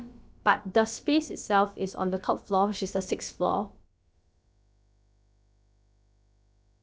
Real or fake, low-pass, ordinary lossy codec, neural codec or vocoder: fake; none; none; codec, 16 kHz, about 1 kbps, DyCAST, with the encoder's durations